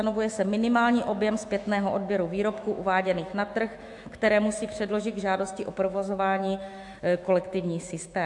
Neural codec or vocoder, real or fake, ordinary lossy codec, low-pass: autoencoder, 48 kHz, 128 numbers a frame, DAC-VAE, trained on Japanese speech; fake; AAC, 64 kbps; 10.8 kHz